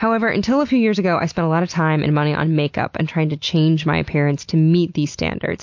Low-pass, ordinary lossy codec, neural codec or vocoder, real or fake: 7.2 kHz; MP3, 48 kbps; none; real